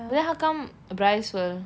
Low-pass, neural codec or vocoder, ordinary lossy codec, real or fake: none; none; none; real